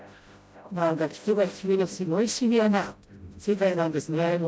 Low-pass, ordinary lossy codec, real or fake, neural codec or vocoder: none; none; fake; codec, 16 kHz, 0.5 kbps, FreqCodec, smaller model